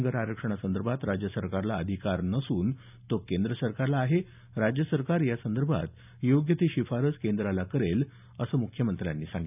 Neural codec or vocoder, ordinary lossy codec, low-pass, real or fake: none; none; 3.6 kHz; real